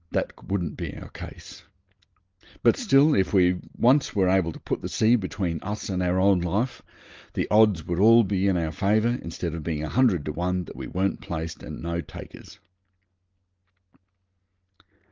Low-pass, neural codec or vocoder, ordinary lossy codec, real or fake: 7.2 kHz; none; Opus, 32 kbps; real